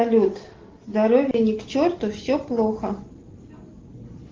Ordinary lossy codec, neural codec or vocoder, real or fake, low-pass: Opus, 16 kbps; none; real; 7.2 kHz